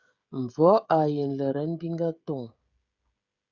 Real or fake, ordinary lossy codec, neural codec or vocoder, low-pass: fake; Opus, 64 kbps; codec, 16 kHz, 16 kbps, FreqCodec, smaller model; 7.2 kHz